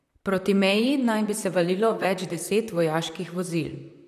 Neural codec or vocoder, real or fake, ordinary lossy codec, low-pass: vocoder, 44.1 kHz, 128 mel bands, Pupu-Vocoder; fake; MP3, 96 kbps; 14.4 kHz